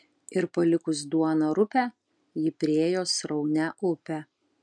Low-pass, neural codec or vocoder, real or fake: 9.9 kHz; none; real